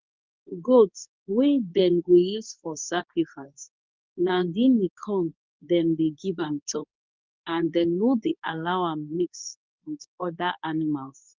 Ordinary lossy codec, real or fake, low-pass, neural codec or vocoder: Opus, 24 kbps; fake; 7.2 kHz; codec, 24 kHz, 0.9 kbps, WavTokenizer, medium speech release version 2